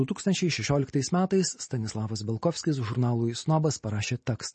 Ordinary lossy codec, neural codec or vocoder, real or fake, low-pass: MP3, 32 kbps; none; real; 9.9 kHz